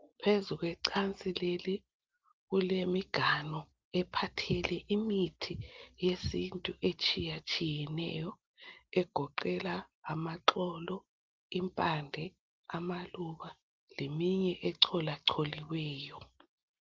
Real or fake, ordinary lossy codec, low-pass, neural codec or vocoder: real; Opus, 32 kbps; 7.2 kHz; none